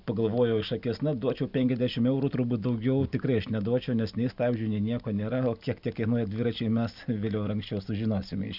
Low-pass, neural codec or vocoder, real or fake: 5.4 kHz; none; real